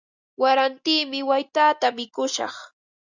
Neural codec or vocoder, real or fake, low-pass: none; real; 7.2 kHz